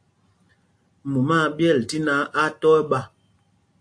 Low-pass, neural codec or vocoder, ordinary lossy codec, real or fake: 9.9 kHz; none; AAC, 64 kbps; real